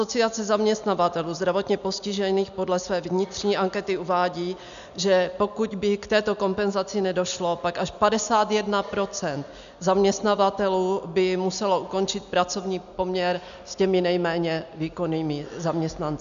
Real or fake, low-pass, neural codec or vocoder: real; 7.2 kHz; none